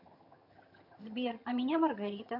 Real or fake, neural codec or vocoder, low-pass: fake; vocoder, 22.05 kHz, 80 mel bands, HiFi-GAN; 5.4 kHz